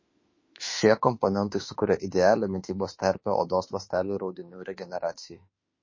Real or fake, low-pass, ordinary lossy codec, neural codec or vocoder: fake; 7.2 kHz; MP3, 32 kbps; autoencoder, 48 kHz, 32 numbers a frame, DAC-VAE, trained on Japanese speech